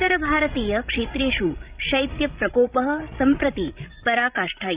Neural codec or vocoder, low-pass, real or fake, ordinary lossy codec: codec, 16 kHz, 16 kbps, FreqCodec, larger model; 3.6 kHz; fake; Opus, 64 kbps